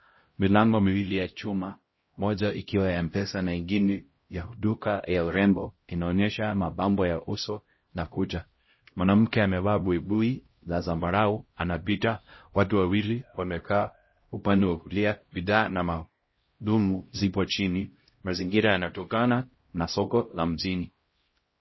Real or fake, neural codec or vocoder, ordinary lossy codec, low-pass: fake; codec, 16 kHz, 0.5 kbps, X-Codec, HuBERT features, trained on LibriSpeech; MP3, 24 kbps; 7.2 kHz